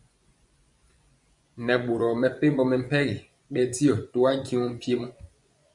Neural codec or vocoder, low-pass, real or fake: vocoder, 24 kHz, 100 mel bands, Vocos; 10.8 kHz; fake